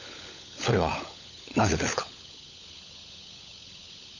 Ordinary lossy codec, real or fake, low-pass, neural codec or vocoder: none; fake; 7.2 kHz; codec, 16 kHz, 16 kbps, FunCodec, trained on LibriTTS, 50 frames a second